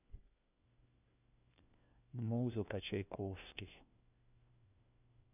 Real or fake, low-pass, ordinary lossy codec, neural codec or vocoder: fake; 3.6 kHz; none; codec, 16 kHz, 1 kbps, FunCodec, trained on LibriTTS, 50 frames a second